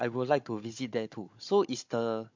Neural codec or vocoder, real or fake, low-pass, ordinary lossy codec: codec, 16 kHz, 16 kbps, FunCodec, trained on Chinese and English, 50 frames a second; fake; 7.2 kHz; MP3, 48 kbps